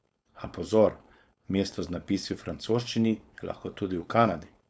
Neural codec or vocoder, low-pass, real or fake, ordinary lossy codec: codec, 16 kHz, 4.8 kbps, FACodec; none; fake; none